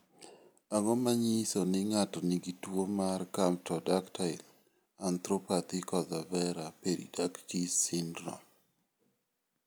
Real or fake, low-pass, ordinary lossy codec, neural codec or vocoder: real; none; none; none